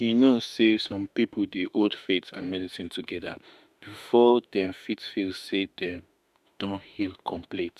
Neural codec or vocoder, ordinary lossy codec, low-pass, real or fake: autoencoder, 48 kHz, 32 numbers a frame, DAC-VAE, trained on Japanese speech; none; 14.4 kHz; fake